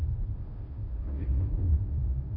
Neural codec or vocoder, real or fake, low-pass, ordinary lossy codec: codec, 16 kHz, 0.5 kbps, FunCodec, trained on Chinese and English, 25 frames a second; fake; 5.4 kHz; none